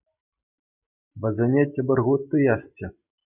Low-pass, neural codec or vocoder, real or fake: 3.6 kHz; none; real